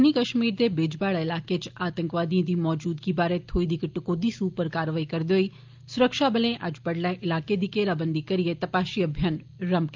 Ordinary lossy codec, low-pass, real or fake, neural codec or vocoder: Opus, 24 kbps; 7.2 kHz; real; none